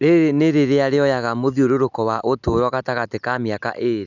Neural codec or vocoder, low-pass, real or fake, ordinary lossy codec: none; 7.2 kHz; real; none